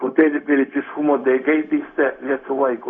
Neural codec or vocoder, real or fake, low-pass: codec, 16 kHz, 0.4 kbps, LongCat-Audio-Codec; fake; 7.2 kHz